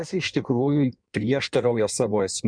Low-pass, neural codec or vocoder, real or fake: 9.9 kHz; codec, 16 kHz in and 24 kHz out, 1.1 kbps, FireRedTTS-2 codec; fake